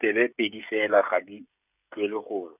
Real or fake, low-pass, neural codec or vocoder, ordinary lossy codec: fake; 3.6 kHz; codec, 16 kHz, 16 kbps, FreqCodec, smaller model; none